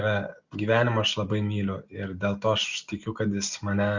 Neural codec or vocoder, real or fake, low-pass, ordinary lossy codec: none; real; 7.2 kHz; Opus, 64 kbps